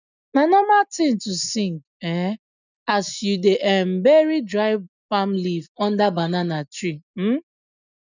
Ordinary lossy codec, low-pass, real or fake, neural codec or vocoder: none; 7.2 kHz; real; none